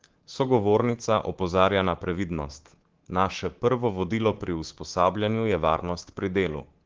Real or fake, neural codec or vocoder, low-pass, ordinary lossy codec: fake; codec, 24 kHz, 3.1 kbps, DualCodec; 7.2 kHz; Opus, 16 kbps